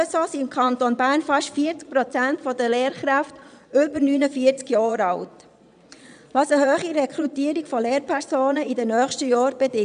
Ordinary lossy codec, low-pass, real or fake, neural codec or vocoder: none; 9.9 kHz; fake; vocoder, 22.05 kHz, 80 mel bands, Vocos